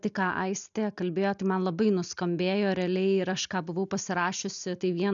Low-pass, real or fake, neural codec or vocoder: 7.2 kHz; real; none